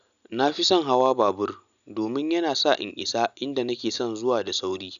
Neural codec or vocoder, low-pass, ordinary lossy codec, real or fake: none; 7.2 kHz; none; real